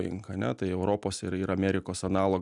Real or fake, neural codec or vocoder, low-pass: real; none; 10.8 kHz